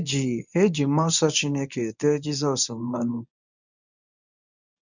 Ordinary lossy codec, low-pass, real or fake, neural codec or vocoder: none; 7.2 kHz; fake; codec, 24 kHz, 0.9 kbps, WavTokenizer, medium speech release version 1